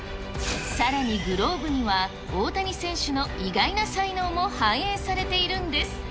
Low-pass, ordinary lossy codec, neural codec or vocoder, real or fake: none; none; none; real